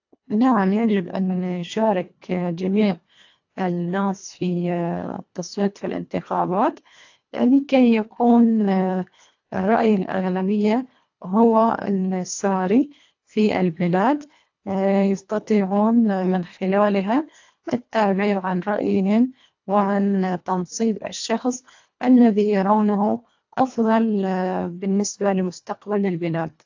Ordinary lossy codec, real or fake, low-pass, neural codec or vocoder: AAC, 48 kbps; fake; 7.2 kHz; codec, 24 kHz, 1.5 kbps, HILCodec